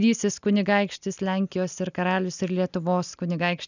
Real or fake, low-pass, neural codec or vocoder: real; 7.2 kHz; none